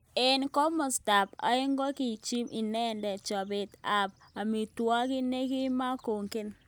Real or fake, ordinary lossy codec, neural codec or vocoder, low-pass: real; none; none; none